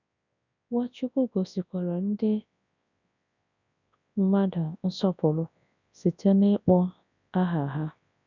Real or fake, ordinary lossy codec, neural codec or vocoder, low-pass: fake; none; codec, 24 kHz, 0.9 kbps, WavTokenizer, large speech release; 7.2 kHz